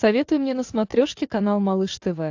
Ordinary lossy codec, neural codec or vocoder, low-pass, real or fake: AAC, 48 kbps; none; 7.2 kHz; real